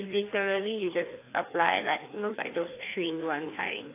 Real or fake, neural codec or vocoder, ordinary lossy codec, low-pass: fake; codec, 16 kHz, 2 kbps, FreqCodec, larger model; AAC, 24 kbps; 3.6 kHz